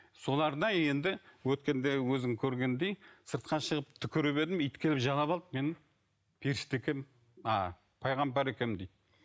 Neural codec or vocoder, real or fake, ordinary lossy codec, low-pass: none; real; none; none